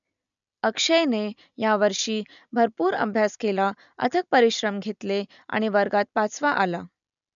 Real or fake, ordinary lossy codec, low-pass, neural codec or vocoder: real; none; 7.2 kHz; none